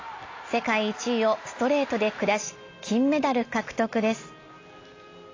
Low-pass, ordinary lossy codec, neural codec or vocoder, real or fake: 7.2 kHz; AAC, 32 kbps; none; real